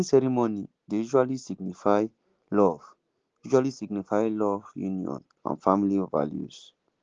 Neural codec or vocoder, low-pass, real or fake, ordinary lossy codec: none; 7.2 kHz; real; Opus, 16 kbps